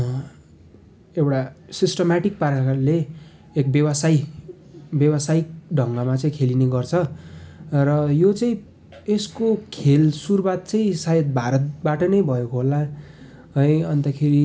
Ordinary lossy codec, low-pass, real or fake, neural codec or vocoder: none; none; real; none